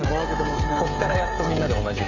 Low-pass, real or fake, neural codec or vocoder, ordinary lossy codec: 7.2 kHz; fake; codec, 16 kHz, 16 kbps, FreqCodec, smaller model; none